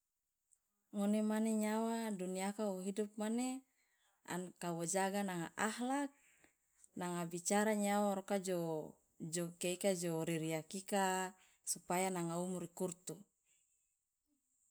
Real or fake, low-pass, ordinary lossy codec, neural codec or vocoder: real; none; none; none